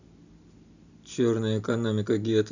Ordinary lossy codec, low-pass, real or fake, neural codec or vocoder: none; 7.2 kHz; real; none